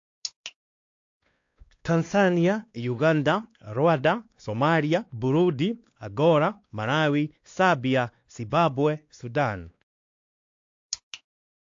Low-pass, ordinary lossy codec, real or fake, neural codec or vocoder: 7.2 kHz; AAC, 48 kbps; fake; codec, 16 kHz, 2 kbps, X-Codec, WavLM features, trained on Multilingual LibriSpeech